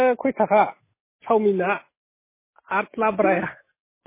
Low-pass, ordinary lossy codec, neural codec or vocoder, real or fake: 3.6 kHz; MP3, 16 kbps; none; real